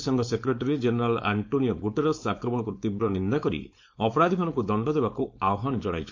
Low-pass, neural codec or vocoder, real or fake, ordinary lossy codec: 7.2 kHz; codec, 16 kHz, 4.8 kbps, FACodec; fake; MP3, 48 kbps